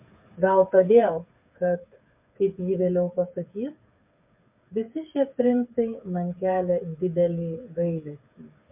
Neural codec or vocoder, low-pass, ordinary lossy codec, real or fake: codec, 16 kHz, 8 kbps, FreqCodec, smaller model; 3.6 kHz; MP3, 32 kbps; fake